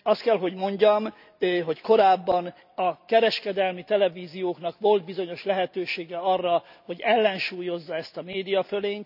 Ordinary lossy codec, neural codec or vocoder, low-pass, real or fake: none; none; 5.4 kHz; real